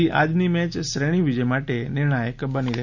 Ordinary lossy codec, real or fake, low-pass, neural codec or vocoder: none; real; 7.2 kHz; none